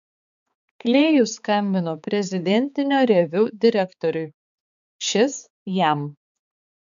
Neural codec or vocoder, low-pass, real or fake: codec, 16 kHz, 4 kbps, X-Codec, HuBERT features, trained on balanced general audio; 7.2 kHz; fake